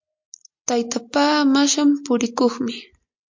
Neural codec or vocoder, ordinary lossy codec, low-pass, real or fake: none; MP3, 48 kbps; 7.2 kHz; real